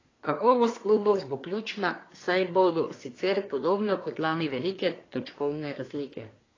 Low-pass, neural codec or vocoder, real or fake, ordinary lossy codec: 7.2 kHz; codec, 24 kHz, 1 kbps, SNAC; fake; AAC, 32 kbps